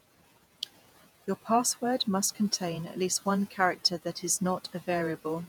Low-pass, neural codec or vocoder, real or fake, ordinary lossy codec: none; vocoder, 48 kHz, 128 mel bands, Vocos; fake; none